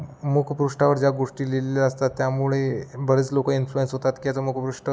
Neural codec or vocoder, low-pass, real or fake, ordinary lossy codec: none; none; real; none